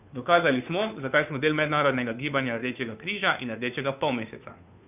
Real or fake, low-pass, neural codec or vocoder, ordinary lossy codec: fake; 3.6 kHz; codec, 16 kHz, 2 kbps, FunCodec, trained on Chinese and English, 25 frames a second; none